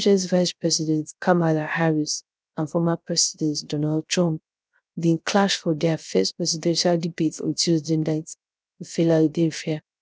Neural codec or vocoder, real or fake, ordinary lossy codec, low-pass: codec, 16 kHz, 0.3 kbps, FocalCodec; fake; none; none